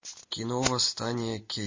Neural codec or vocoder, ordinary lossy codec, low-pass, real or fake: none; MP3, 32 kbps; 7.2 kHz; real